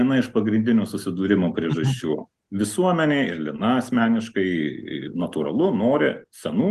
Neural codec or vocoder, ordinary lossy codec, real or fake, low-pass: none; Opus, 24 kbps; real; 14.4 kHz